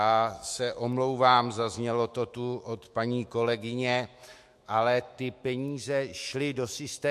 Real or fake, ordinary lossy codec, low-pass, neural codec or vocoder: fake; MP3, 64 kbps; 14.4 kHz; autoencoder, 48 kHz, 128 numbers a frame, DAC-VAE, trained on Japanese speech